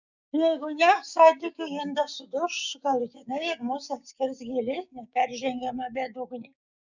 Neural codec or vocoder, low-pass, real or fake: codec, 16 kHz, 6 kbps, DAC; 7.2 kHz; fake